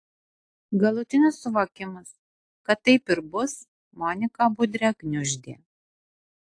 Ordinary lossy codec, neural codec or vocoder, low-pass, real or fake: AAC, 48 kbps; none; 9.9 kHz; real